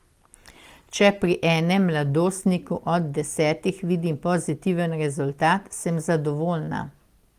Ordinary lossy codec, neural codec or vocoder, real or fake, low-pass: Opus, 24 kbps; none; real; 14.4 kHz